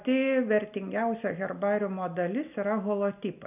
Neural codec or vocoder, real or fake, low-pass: none; real; 3.6 kHz